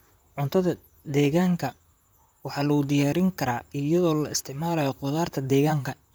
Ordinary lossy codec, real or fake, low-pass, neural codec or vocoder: none; fake; none; vocoder, 44.1 kHz, 128 mel bands, Pupu-Vocoder